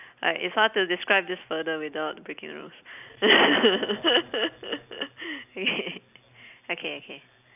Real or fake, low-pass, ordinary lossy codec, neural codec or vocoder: real; 3.6 kHz; none; none